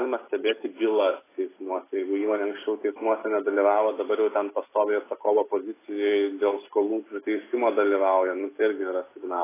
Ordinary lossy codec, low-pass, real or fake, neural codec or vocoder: AAC, 16 kbps; 3.6 kHz; real; none